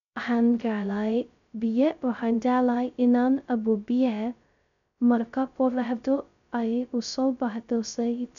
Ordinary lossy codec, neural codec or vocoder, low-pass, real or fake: none; codec, 16 kHz, 0.2 kbps, FocalCodec; 7.2 kHz; fake